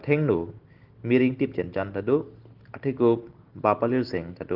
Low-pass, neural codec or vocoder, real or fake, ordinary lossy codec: 5.4 kHz; none; real; Opus, 16 kbps